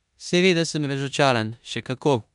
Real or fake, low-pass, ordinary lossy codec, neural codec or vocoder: fake; 10.8 kHz; none; codec, 16 kHz in and 24 kHz out, 0.9 kbps, LongCat-Audio-Codec, four codebook decoder